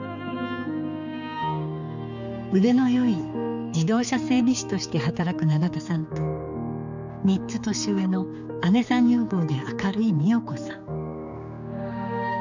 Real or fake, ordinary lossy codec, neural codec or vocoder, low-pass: fake; none; codec, 16 kHz, 4 kbps, X-Codec, HuBERT features, trained on general audio; 7.2 kHz